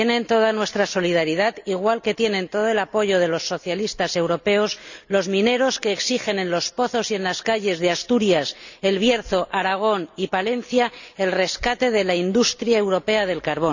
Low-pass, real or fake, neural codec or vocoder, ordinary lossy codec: 7.2 kHz; real; none; none